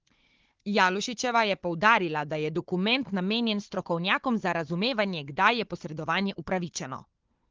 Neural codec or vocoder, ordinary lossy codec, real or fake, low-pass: codec, 16 kHz, 4 kbps, FunCodec, trained on Chinese and English, 50 frames a second; Opus, 16 kbps; fake; 7.2 kHz